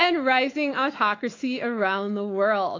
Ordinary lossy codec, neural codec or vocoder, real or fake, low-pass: AAC, 32 kbps; autoencoder, 48 kHz, 128 numbers a frame, DAC-VAE, trained on Japanese speech; fake; 7.2 kHz